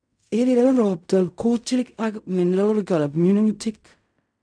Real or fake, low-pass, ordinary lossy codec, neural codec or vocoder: fake; 9.9 kHz; none; codec, 16 kHz in and 24 kHz out, 0.4 kbps, LongCat-Audio-Codec, fine tuned four codebook decoder